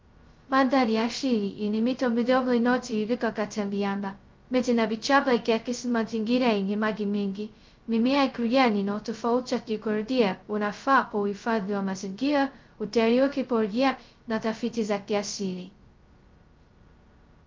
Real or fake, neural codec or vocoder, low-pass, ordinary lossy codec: fake; codec, 16 kHz, 0.2 kbps, FocalCodec; 7.2 kHz; Opus, 24 kbps